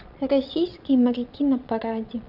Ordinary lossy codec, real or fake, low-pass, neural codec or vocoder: none; fake; 5.4 kHz; vocoder, 22.05 kHz, 80 mel bands, Vocos